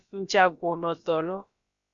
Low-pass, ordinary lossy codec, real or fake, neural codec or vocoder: 7.2 kHz; Opus, 64 kbps; fake; codec, 16 kHz, about 1 kbps, DyCAST, with the encoder's durations